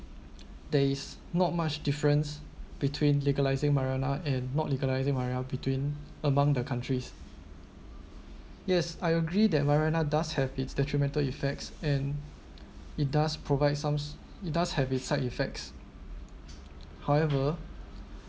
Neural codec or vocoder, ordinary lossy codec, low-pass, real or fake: none; none; none; real